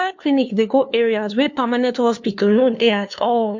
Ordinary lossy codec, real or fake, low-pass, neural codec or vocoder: MP3, 48 kbps; fake; 7.2 kHz; codec, 16 kHz, 2 kbps, FunCodec, trained on LibriTTS, 25 frames a second